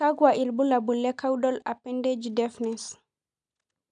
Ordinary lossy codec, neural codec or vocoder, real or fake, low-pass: none; none; real; 9.9 kHz